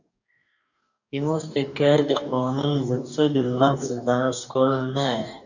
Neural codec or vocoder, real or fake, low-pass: codec, 44.1 kHz, 2.6 kbps, DAC; fake; 7.2 kHz